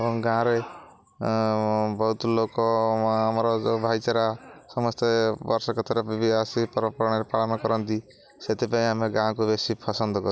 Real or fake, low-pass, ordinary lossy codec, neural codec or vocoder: real; none; none; none